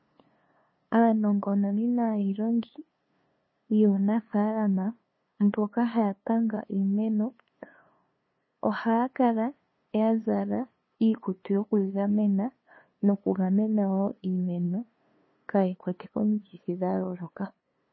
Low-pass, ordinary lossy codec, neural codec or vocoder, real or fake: 7.2 kHz; MP3, 24 kbps; codec, 16 kHz, 2 kbps, FunCodec, trained on LibriTTS, 25 frames a second; fake